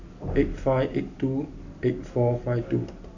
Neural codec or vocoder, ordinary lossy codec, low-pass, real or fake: none; none; 7.2 kHz; real